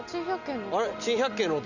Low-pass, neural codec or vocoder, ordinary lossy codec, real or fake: 7.2 kHz; none; none; real